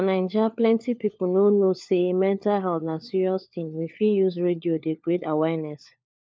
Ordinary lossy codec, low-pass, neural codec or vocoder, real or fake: none; none; codec, 16 kHz, 4 kbps, FunCodec, trained on LibriTTS, 50 frames a second; fake